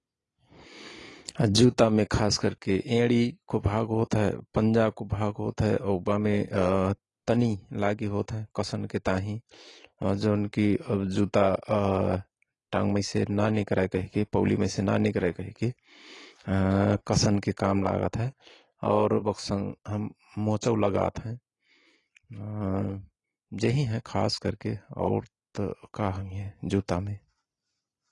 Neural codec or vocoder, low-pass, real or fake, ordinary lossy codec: none; 10.8 kHz; real; AAC, 32 kbps